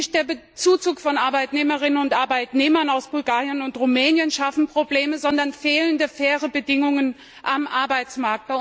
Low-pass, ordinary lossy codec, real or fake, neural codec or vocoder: none; none; real; none